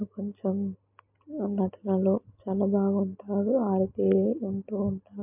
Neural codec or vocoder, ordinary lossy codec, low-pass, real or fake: none; MP3, 32 kbps; 3.6 kHz; real